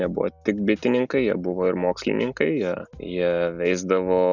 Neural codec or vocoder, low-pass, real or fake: none; 7.2 kHz; real